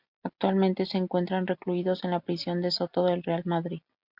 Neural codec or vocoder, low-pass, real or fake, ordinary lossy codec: none; 5.4 kHz; real; MP3, 48 kbps